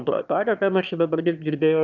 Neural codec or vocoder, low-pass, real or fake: autoencoder, 22.05 kHz, a latent of 192 numbers a frame, VITS, trained on one speaker; 7.2 kHz; fake